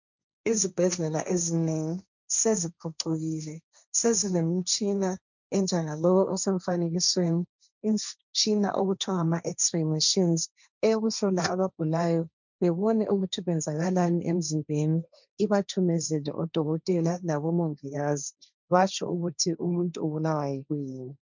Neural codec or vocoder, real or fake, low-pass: codec, 16 kHz, 1.1 kbps, Voila-Tokenizer; fake; 7.2 kHz